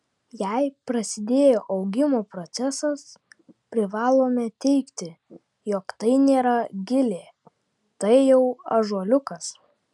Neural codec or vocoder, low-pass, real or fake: none; 10.8 kHz; real